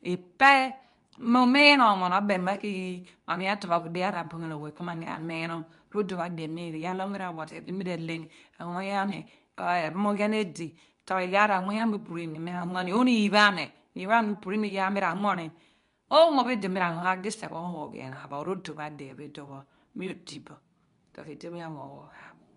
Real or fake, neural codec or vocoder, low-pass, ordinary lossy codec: fake; codec, 24 kHz, 0.9 kbps, WavTokenizer, medium speech release version 2; 10.8 kHz; none